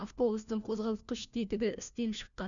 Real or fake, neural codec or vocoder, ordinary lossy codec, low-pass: fake; codec, 16 kHz, 1 kbps, FunCodec, trained on LibriTTS, 50 frames a second; none; 7.2 kHz